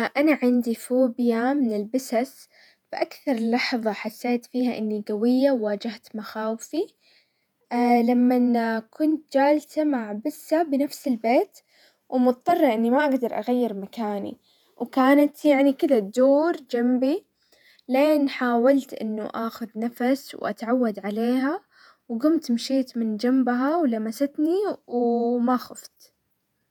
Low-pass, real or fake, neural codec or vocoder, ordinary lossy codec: 19.8 kHz; fake; vocoder, 48 kHz, 128 mel bands, Vocos; none